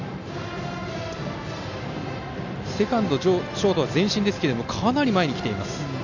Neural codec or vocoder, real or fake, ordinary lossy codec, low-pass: none; real; none; 7.2 kHz